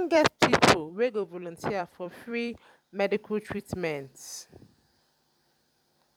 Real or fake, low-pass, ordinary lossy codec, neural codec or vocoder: fake; 19.8 kHz; none; vocoder, 44.1 kHz, 128 mel bands every 256 samples, BigVGAN v2